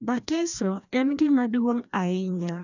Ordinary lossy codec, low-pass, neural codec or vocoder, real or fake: none; 7.2 kHz; codec, 16 kHz, 1 kbps, FreqCodec, larger model; fake